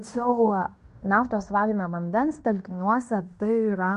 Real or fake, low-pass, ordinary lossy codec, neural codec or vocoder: fake; 10.8 kHz; MP3, 64 kbps; codec, 16 kHz in and 24 kHz out, 0.9 kbps, LongCat-Audio-Codec, fine tuned four codebook decoder